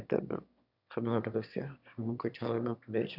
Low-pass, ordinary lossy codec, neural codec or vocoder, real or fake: 5.4 kHz; none; autoencoder, 22.05 kHz, a latent of 192 numbers a frame, VITS, trained on one speaker; fake